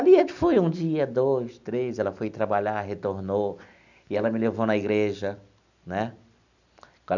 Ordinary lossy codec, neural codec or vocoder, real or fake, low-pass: none; none; real; 7.2 kHz